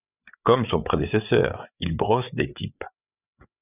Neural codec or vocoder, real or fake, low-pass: codec, 16 kHz, 8 kbps, FreqCodec, larger model; fake; 3.6 kHz